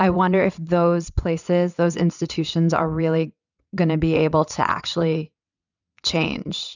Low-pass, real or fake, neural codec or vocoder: 7.2 kHz; fake; vocoder, 22.05 kHz, 80 mel bands, WaveNeXt